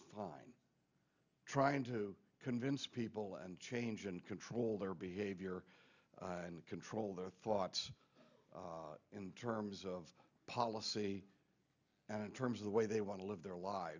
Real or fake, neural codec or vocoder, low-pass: real; none; 7.2 kHz